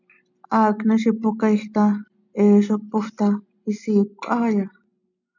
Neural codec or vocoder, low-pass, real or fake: none; 7.2 kHz; real